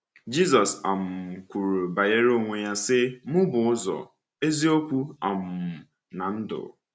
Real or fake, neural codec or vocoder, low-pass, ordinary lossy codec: real; none; none; none